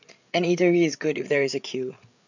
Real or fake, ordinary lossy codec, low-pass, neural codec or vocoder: fake; none; 7.2 kHz; codec, 16 kHz, 8 kbps, FreqCodec, larger model